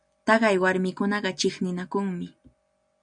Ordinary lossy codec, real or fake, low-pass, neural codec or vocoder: MP3, 96 kbps; real; 9.9 kHz; none